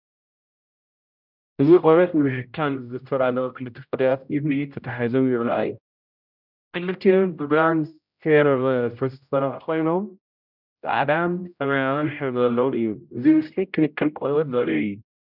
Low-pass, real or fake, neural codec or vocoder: 5.4 kHz; fake; codec, 16 kHz, 0.5 kbps, X-Codec, HuBERT features, trained on general audio